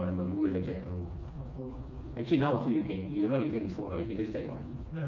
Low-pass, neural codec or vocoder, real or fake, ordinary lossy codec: 7.2 kHz; codec, 16 kHz, 2 kbps, FreqCodec, smaller model; fake; none